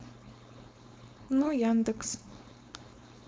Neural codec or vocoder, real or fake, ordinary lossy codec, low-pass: codec, 16 kHz, 4.8 kbps, FACodec; fake; none; none